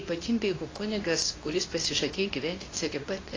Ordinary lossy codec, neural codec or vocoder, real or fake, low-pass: AAC, 32 kbps; codec, 24 kHz, 0.9 kbps, WavTokenizer, medium speech release version 2; fake; 7.2 kHz